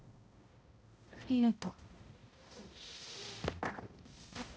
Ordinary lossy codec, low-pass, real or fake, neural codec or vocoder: none; none; fake; codec, 16 kHz, 0.5 kbps, X-Codec, HuBERT features, trained on balanced general audio